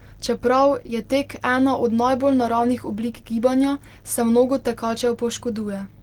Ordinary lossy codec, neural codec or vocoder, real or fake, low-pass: Opus, 16 kbps; none; real; 19.8 kHz